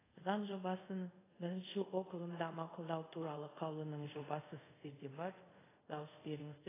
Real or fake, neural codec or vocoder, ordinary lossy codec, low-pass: fake; codec, 24 kHz, 0.5 kbps, DualCodec; AAC, 16 kbps; 3.6 kHz